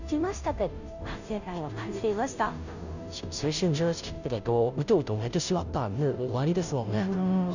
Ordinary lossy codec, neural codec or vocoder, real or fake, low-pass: none; codec, 16 kHz, 0.5 kbps, FunCodec, trained on Chinese and English, 25 frames a second; fake; 7.2 kHz